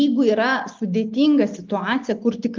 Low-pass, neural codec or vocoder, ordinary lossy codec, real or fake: 7.2 kHz; none; Opus, 24 kbps; real